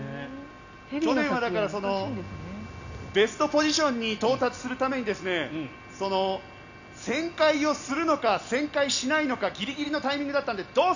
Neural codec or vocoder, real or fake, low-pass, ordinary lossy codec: none; real; 7.2 kHz; none